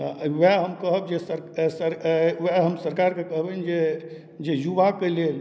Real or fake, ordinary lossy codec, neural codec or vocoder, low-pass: real; none; none; none